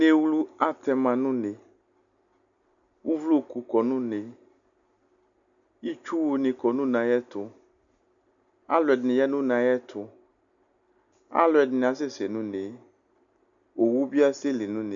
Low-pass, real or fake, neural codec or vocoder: 7.2 kHz; real; none